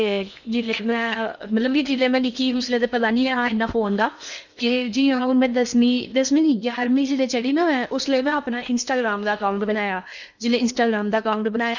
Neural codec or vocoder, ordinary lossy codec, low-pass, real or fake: codec, 16 kHz in and 24 kHz out, 0.8 kbps, FocalCodec, streaming, 65536 codes; none; 7.2 kHz; fake